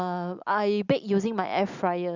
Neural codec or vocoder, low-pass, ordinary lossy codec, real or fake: none; 7.2 kHz; Opus, 64 kbps; real